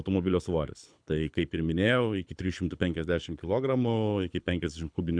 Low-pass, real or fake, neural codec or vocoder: 9.9 kHz; fake; codec, 24 kHz, 6 kbps, HILCodec